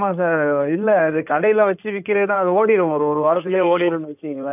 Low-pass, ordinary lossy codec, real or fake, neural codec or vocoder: 3.6 kHz; none; fake; codec, 16 kHz in and 24 kHz out, 2.2 kbps, FireRedTTS-2 codec